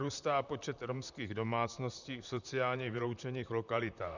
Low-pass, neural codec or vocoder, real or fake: 7.2 kHz; vocoder, 44.1 kHz, 128 mel bands, Pupu-Vocoder; fake